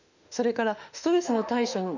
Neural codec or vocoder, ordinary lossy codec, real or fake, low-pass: autoencoder, 48 kHz, 32 numbers a frame, DAC-VAE, trained on Japanese speech; none; fake; 7.2 kHz